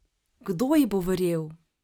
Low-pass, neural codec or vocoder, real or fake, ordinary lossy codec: none; none; real; none